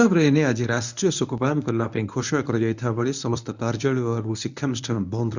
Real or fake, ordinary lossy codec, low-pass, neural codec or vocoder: fake; none; 7.2 kHz; codec, 24 kHz, 0.9 kbps, WavTokenizer, medium speech release version 1